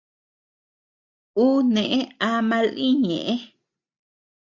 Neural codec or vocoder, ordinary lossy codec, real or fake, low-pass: none; Opus, 64 kbps; real; 7.2 kHz